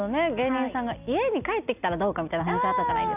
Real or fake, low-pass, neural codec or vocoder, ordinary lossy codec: real; 3.6 kHz; none; none